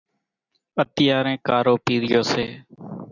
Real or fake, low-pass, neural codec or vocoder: real; 7.2 kHz; none